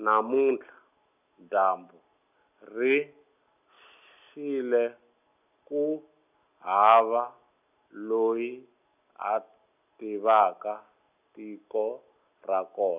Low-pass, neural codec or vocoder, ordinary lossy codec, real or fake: 3.6 kHz; none; none; real